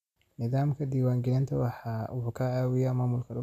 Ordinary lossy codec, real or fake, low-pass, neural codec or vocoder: none; real; 14.4 kHz; none